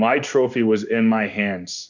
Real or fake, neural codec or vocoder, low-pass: fake; codec, 16 kHz in and 24 kHz out, 1 kbps, XY-Tokenizer; 7.2 kHz